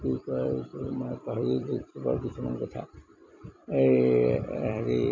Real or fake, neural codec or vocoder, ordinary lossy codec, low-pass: fake; vocoder, 44.1 kHz, 128 mel bands every 256 samples, BigVGAN v2; MP3, 64 kbps; 7.2 kHz